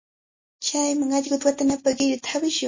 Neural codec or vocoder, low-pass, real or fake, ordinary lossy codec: none; 7.2 kHz; real; MP3, 32 kbps